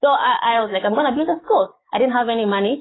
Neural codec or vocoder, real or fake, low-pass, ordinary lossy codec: codec, 44.1 kHz, 7.8 kbps, DAC; fake; 7.2 kHz; AAC, 16 kbps